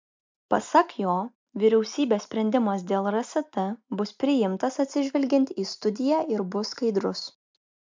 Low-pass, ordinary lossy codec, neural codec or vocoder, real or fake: 7.2 kHz; MP3, 64 kbps; none; real